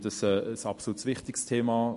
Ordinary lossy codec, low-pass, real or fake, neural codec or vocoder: MP3, 48 kbps; 14.4 kHz; real; none